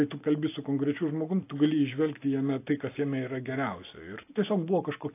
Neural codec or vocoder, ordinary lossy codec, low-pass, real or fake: none; AAC, 24 kbps; 3.6 kHz; real